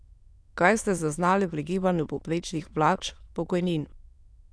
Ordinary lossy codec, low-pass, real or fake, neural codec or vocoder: none; none; fake; autoencoder, 22.05 kHz, a latent of 192 numbers a frame, VITS, trained on many speakers